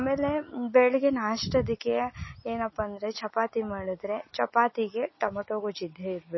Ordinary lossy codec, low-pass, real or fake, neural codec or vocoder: MP3, 24 kbps; 7.2 kHz; real; none